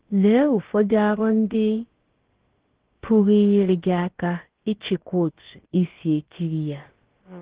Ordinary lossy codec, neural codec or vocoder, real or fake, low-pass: Opus, 16 kbps; codec, 16 kHz, about 1 kbps, DyCAST, with the encoder's durations; fake; 3.6 kHz